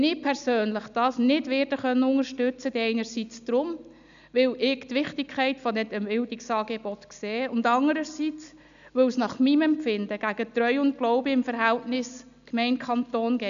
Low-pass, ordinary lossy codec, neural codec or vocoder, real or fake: 7.2 kHz; none; none; real